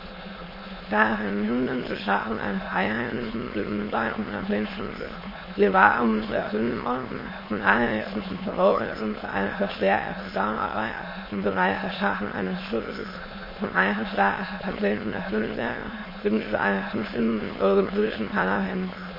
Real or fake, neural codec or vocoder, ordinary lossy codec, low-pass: fake; autoencoder, 22.05 kHz, a latent of 192 numbers a frame, VITS, trained on many speakers; MP3, 24 kbps; 5.4 kHz